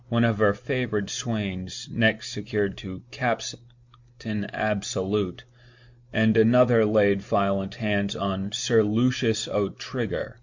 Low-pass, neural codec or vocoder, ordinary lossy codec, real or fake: 7.2 kHz; none; MP3, 64 kbps; real